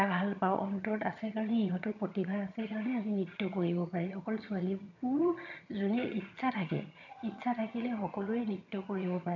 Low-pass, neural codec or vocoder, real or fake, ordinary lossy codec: 7.2 kHz; vocoder, 22.05 kHz, 80 mel bands, HiFi-GAN; fake; none